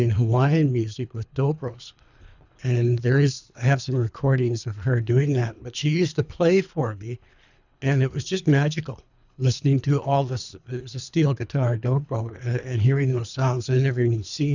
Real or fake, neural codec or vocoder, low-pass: fake; codec, 24 kHz, 3 kbps, HILCodec; 7.2 kHz